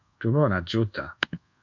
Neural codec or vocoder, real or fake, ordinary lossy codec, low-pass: codec, 24 kHz, 1.2 kbps, DualCodec; fake; MP3, 64 kbps; 7.2 kHz